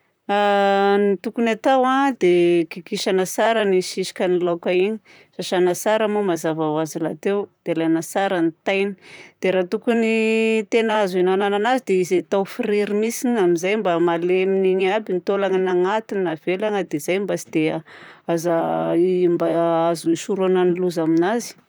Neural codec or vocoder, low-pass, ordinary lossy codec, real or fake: vocoder, 44.1 kHz, 128 mel bands, Pupu-Vocoder; none; none; fake